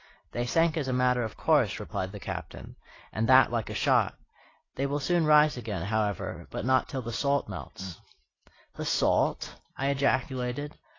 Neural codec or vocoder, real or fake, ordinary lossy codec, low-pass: none; real; AAC, 32 kbps; 7.2 kHz